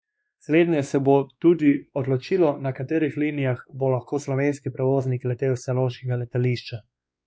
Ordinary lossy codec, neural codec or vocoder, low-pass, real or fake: none; codec, 16 kHz, 2 kbps, X-Codec, WavLM features, trained on Multilingual LibriSpeech; none; fake